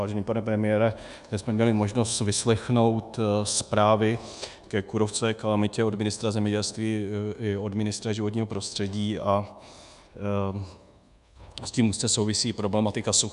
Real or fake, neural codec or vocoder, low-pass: fake; codec, 24 kHz, 1.2 kbps, DualCodec; 10.8 kHz